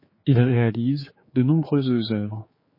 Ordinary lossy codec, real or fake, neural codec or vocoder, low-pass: MP3, 24 kbps; fake; codec, 16 kHz, 4 kbps, X-Codec, HuBERT features, trained on general audio; 5.4 kHz